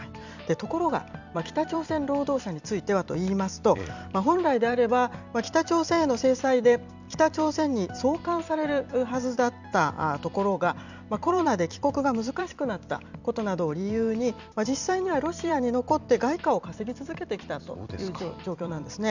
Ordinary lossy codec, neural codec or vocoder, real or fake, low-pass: none; vocoder, 44.1 kHz, 128 mel bands every 256 samples, BigVGAN v2; fake; 7.2 kHz